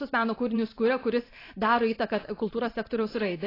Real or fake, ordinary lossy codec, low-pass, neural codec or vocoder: fake; AAC, 24 kbps; 5.4 kHz; vocoder, 44.1 kHz, 128 mel bands every 512 samples, BigVGAN v2